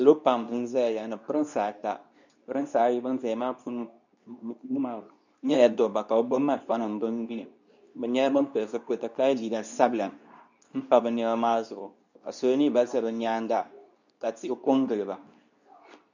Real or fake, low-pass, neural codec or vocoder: fake; 7.2 kHz; codec, 24 kHz, 0.9 kbps, WavTokenizer, medium speech release version 2